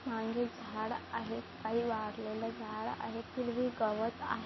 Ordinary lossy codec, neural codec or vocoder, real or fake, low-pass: MP3, 24 kbps; none; real; 7.2 kHz